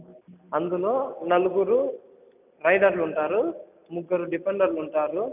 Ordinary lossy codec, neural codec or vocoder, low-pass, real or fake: none; none; 3.6 kHz; real